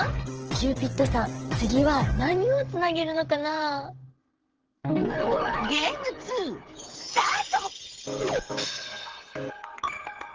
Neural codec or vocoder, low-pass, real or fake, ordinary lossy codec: codec, 16 kHz, 8 kbps, FreqCodec, smaller model; 7.2 kHz; fake; Opus, 16 kbps